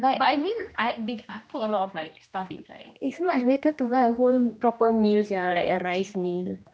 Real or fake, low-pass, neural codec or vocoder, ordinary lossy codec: fake; none; codec, 16 kHz, 1 kbps, X-Codec, HuBERT features, trained on general audio; none